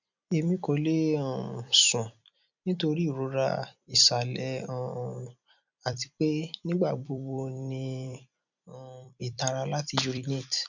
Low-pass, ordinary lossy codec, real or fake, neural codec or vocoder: 7.2 kHz; none; fake; vocoder, 44.1 kHz, 128 mel bands every 256 samples, BigVGAN v2